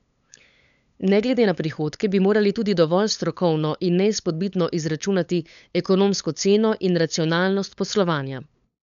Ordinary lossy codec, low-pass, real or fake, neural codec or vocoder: none; 7.2 kHz; fake; codec, 16 kHz, 8 kbps, FunCodec, trained on LibriTTS, 25 frames a second